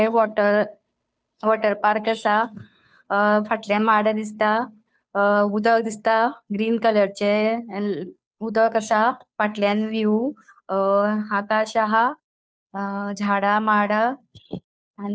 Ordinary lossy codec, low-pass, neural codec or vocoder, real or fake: none; none; codec, 16 kHz, 2 kbps, FunCodec, trained on Chinese and English, 25 frames a second; fake